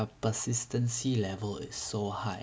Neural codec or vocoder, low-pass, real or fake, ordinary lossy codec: none; none; real; none